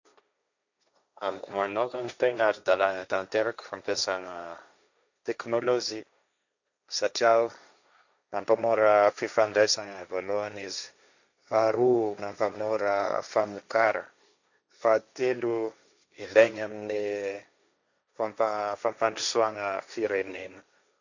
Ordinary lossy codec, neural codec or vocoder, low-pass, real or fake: none; codec, 16 kHz, 1.1 kbps, Voila-Tokenizer; 7.2 kHz; fake